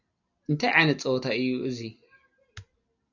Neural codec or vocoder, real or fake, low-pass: none; real; 7.2 kHz